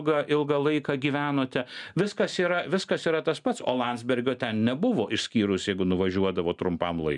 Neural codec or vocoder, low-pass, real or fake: none; 10.8 kHz; real